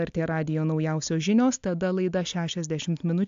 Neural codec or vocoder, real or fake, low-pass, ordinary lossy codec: none; real; 7.2 kHz; AAC, 64 kbps